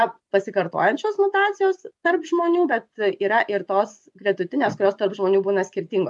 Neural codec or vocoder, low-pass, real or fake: vocoder, 24 kHz, 100 mel bands, Vocos; 10.8 kHz; fake